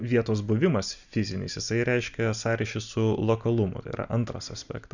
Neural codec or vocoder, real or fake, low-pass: none; real; 7.2 kHz